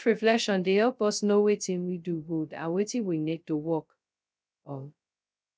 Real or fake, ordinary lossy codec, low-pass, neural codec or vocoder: fake; none; none; codec, 16 kHz, 0.2 kbps, FocalCodec